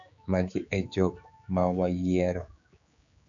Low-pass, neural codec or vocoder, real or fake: 7.2 kHz; codec, 16 kHz, 4 kbps, X-Codec, HuBERT features, trained on general audio; fake